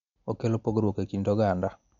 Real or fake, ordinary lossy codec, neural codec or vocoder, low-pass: real; MP3, 64 kbps; none; 7.2 kHz